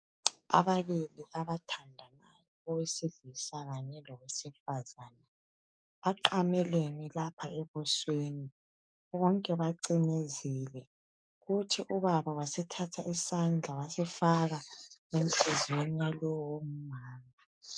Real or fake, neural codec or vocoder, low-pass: fake; codec, 44.1 kHz, 7.8 kbps, DAC; 9.9 kHz